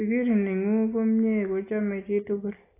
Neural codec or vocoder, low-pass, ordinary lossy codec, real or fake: none; 3.6 kHz; AAC, 16 kbps; real